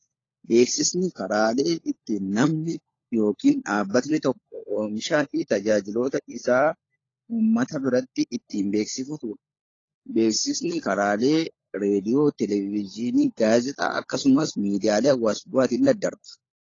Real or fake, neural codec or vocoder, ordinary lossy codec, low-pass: fake; codec, 16 kHz, 16 kbps, FunCodec, trained on LibriTTS, 50 frames a second; AAC, 32 kbps; 7.2 kHz